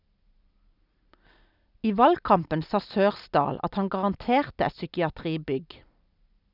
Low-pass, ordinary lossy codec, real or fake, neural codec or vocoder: 5.4 kHz; none; real; none